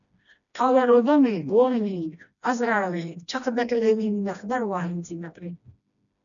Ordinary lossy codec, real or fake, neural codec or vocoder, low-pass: MP3, 96 kbps; fake; codec, 16 kHz, 1 kbps, FreqCodec, smaller model; 7.2 kHz